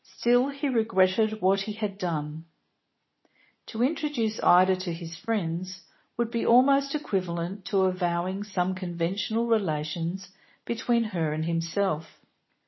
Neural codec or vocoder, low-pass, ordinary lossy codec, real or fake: none; 7.2 kHz; MP3, 24 kbps; real